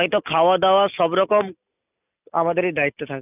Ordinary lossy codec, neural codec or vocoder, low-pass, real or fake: none; none; 3.6 kHz; real